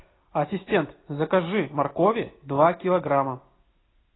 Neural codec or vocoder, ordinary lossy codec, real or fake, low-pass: none; AAC, 16 kbps; real; 7.2 kHz